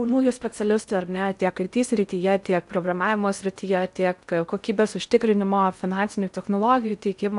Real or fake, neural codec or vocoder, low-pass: fake; codec, 16 kHz in and 24 kHz out, 0.6 kbps, FocalCodec, streaming, 4096 codes; 10.8 kHz